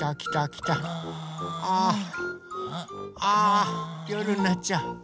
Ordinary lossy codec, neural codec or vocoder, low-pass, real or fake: none; none; none; real